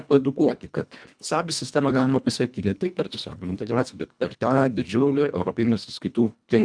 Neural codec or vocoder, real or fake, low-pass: codec, 24 kHz, 1.5 kbps, HILCodec; fake; 9.9 kHz